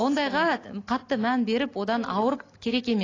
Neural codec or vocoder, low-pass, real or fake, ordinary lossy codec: none; 7.2 kHz; real; AAC, 32 kbps